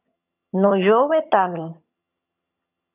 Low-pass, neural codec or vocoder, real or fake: 3.6 kHz; vocoder, 22.05 kHz, 80 mel bands, HiFi-GAN; fake